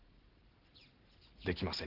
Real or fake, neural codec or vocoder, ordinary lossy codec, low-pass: real; none; Opus, 16 kbps; 5.4 kHz